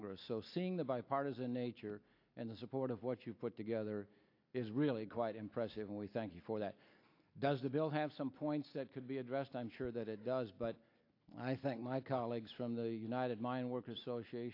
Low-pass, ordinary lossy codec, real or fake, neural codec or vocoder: 5.4 kHz; AAC, 32 kbps; real; none